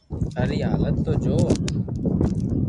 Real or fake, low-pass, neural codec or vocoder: real; 10.8 kHz; none